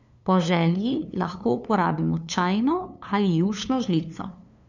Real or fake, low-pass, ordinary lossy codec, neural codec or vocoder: fake; 7.2 kHz; none; codec, 16 kHz, 2 kbps, FunCodec, trained on LibriTTS, 25 frames a second